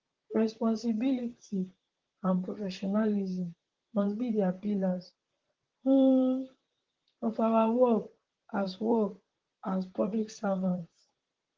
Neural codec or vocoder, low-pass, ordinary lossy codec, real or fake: vocoder, 44.1 kHz, 128 mel bands, Pupu-Vocoder; 7.2 kHz; Opus, 16 kbps; fake